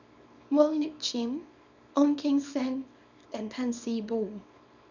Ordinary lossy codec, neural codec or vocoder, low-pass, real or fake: none; codec, 24 kHz, 0.9 kbps, WavTokenizer, small release; 7.2 kHz; fake